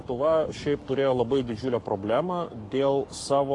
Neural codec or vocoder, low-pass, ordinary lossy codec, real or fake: codec, 44.1 kHz, 7.8 kbps, Pupu-Codec; 10.8 kHz; AAC, 48 kbps; fake